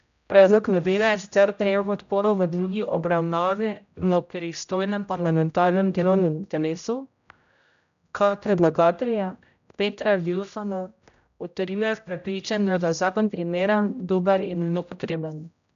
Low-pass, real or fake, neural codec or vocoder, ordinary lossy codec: 7.2 kHz; fake; codec, 16 kHz, 0.5 kbps, X-Codec, HuBERT features, trained on general audio; none